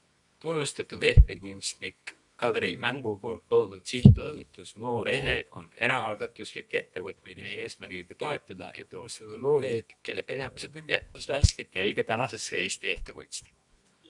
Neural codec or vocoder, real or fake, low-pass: codec, 24 kHz, 0.9 kbps, WavTokenizer, medium music audio release; fake; 10.8 kHz